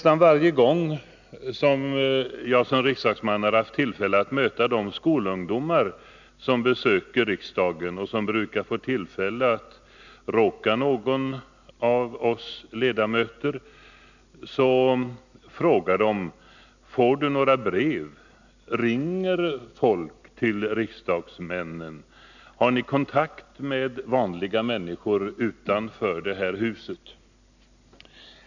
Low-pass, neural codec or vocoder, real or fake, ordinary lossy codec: 7.2 kHz; none; real; none